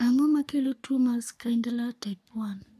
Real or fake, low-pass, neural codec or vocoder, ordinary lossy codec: fake; 14.4 kHz; codec, 44.1 kHz, 3.4 kbps, Pupu-Codec; none